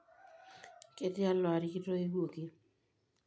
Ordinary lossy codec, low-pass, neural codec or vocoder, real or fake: none; none; none; real